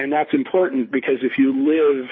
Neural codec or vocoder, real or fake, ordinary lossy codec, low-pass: codec, 24 kHz, 6 kbps, HILCodec; fake; MP3, 24 kbps; 7.2 kHz